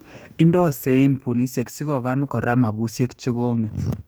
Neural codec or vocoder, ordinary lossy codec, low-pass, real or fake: codec, 44.1 kHz, 2.6 kbps, DAC; none; none; fake